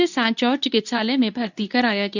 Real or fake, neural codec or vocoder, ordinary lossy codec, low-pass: fake; codec, 24 kHz, 0.9 kbps, WavTokenizer, medium speech release version 2; none; 7.2 kHz